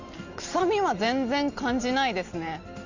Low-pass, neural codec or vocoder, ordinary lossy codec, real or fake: 7.2 kHz; none; Opus, 64 kbps; real